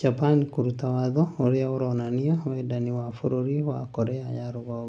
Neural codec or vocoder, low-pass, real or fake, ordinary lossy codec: none; none; real; none